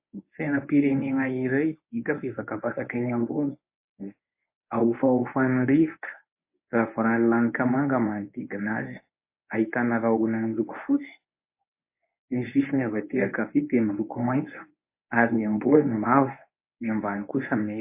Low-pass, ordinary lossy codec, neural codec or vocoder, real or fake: 3.6 kHz; MP3, 24 kbps; codec, 24 kHz, 0.9 kbps, WavTokenizer, medium speech release version 1; fake